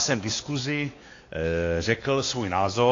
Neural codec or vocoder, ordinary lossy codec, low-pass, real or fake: codec, 16 kHz, 2 kbps, X-Codec, WavLM features, trained on Multilingual LibriSpeech; AAC, 32 kbps; 7.2 kHz; fake